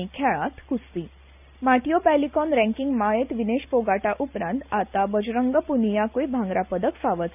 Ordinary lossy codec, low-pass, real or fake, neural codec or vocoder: none; 3.6 kHz; real; none